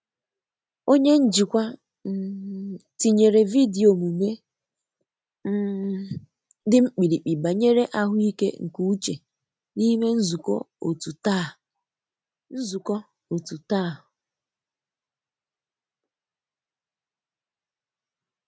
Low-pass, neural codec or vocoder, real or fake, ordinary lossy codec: none; none; real; none